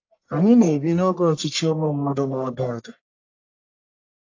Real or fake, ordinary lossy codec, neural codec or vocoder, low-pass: fake; AAC, 48 kbps; codec, 44.1 kHz, 1.7 kbps, Pupu-Codec; 7.2 kHz